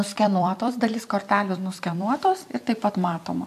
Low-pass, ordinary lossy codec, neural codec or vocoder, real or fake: 14.4 kHz; AAC, 96 kbps; vocoder, 44.1 kHz, 128 mel bands every 256 samples, BigVGAN v2; fake